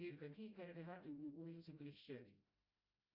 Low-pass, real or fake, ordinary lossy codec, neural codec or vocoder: 5.4 kHz; fake; Opus, 64 kbps; codec, 16 kHz, 0.5 kbps, FreqCodec, smaller model